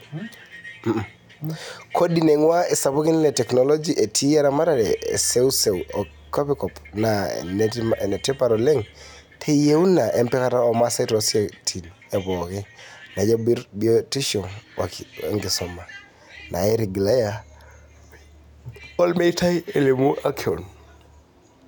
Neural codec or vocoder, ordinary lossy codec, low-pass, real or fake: none; none; none; real